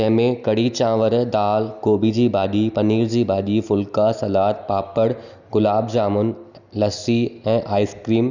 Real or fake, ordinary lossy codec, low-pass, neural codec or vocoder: real; none; 7.2 kHz; none